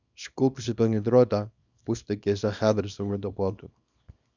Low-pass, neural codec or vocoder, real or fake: 7.2 kHz; codec, 24 kHz, 0.9 kbps, WavTokenizer, small release; fake